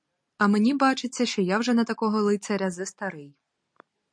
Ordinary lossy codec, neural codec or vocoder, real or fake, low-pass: MP3, 48 kbps; none; real; 9.9 kHz